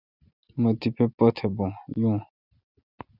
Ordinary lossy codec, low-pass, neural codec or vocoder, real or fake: Opus, 64 kbps; 5.4 kHz; none; real